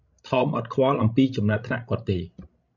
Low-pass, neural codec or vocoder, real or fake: 7.2 kHz; codec, 16 kHz, 16 kbps, FreqCodec, larger model; fake